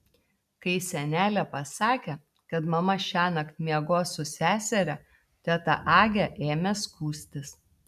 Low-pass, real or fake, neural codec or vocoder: 14.4 kHz; real; none